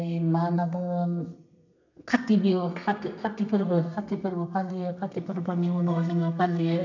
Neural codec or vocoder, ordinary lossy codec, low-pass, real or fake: codec, 32 kHz, 1.9 kbps, SNAC; none; 7.2 kHz; fake